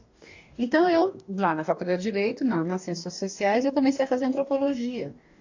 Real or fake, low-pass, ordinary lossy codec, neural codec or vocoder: fake; 7.2 kHz; AAC, 48 kbps; codec, 44.1 kHz, 2.6 kbps, DAC